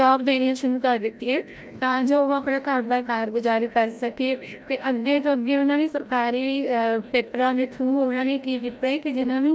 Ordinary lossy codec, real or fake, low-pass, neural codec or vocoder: none; fake; none; codec, 16 kHz, 0.5 kbps, FreqCodec, larger model